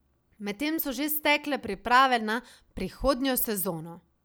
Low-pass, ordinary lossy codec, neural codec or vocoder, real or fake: none; none; none; real